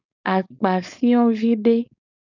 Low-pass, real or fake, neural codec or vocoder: 7.2 kHz; fake; codec, 16 kHz, 4.8 kbps, FACodec